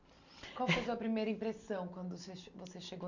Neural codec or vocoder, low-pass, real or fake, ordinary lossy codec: none; 7.2 kHz; real; none